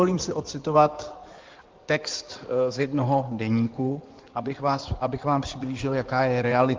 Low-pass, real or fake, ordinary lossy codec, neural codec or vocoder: 7.2 kHz; fake; Opus, 24 kbps; codec, 16 kHz in and 24 kHz out, 2.2 kbps, FireRedTTS-2 codec